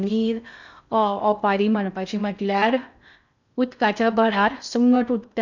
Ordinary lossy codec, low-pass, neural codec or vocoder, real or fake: none; 7.2 kHz; codec, 16 kHz in and 24 kHz out, 0.6 kbps, FocalCodec, streaming, 2048 codes; fake